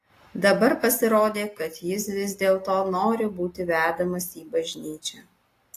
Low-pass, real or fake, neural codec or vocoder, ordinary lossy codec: 14.4 kHz; real; none; AAC, 48 kbps